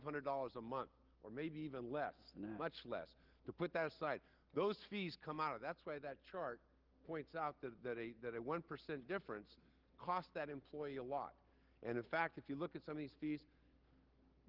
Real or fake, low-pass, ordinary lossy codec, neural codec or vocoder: real; 5.4 kHz; Opus, 16 kbps; none